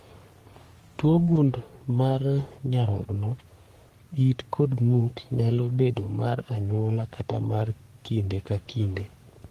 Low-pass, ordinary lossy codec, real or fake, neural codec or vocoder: 14.4 kHz; Opus, 24 kbps; fake; codec, 44.1 kHz, 3.4 kbps, Pupu-Codec